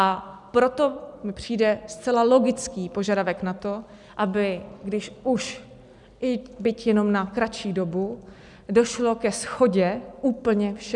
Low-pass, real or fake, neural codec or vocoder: 10.8 kHz; real; none